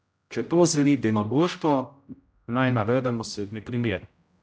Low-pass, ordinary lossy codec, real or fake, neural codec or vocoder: none; none; fake; codec, 16 kHz, 0.5 kbps, X-Codec, HuBERT features, trained on general audio